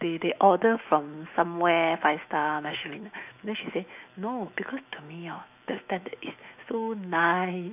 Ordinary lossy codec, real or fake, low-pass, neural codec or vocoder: none; fake; 3.6 kHz; autoencoder, 48 kHz, 128 numbers a frame, DAC-VAE, trained on Japanese speech